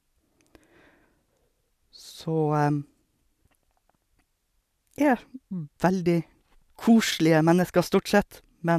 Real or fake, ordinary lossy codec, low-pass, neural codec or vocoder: real; none; 14.4 kHz; none